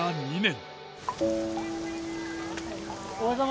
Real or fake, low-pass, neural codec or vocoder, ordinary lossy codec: real; none; none; none